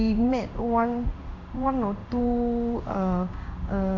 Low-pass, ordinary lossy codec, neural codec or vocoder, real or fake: 7.2 kHz; AAC, 48 kbps; codec, 16 kHz in and 24 kHz out, 1 kbps, XY-Tokenizer; fake